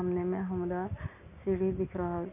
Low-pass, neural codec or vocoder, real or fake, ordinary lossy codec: 3.6 kHz; none; real; none